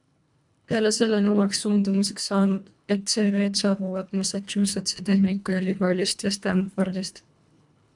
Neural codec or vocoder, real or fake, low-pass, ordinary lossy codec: codec, 24 kHz, 1.5 kbps, HILCodec; fake; 10.8 kHz; MP3, 96 kbps